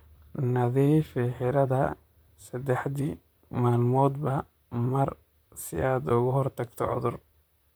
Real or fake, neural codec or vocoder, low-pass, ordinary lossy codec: fake; vocoder, 44.1 kHz, 128 mel bands, Pupu-Vocoder; none; none